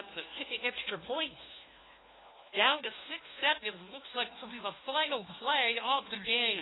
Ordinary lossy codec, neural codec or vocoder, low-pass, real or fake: AAC, 16 kbps; codec, 16 kHz, 1 kbps, FunCodec, trained on LibriTTS, 50 frames a second; 7.2 kHz; fake